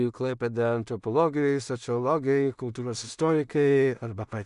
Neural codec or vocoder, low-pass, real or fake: codec, 16 kHz in and 24 kHz out, 0.4 kbps, LongCat-Audio-Codec, two codebook decoder; 10.8 kHz; fake